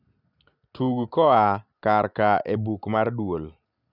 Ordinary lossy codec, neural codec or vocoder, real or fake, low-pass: none; none; real; 5.4 kHz